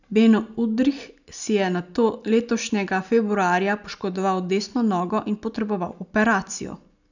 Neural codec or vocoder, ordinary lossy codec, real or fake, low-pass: none; none; real; 7.2 kHz